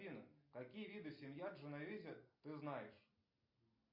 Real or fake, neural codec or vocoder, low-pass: real; none; 5.4 kHz